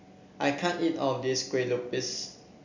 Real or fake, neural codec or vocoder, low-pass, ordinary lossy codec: real; none; 7.2 kHz; none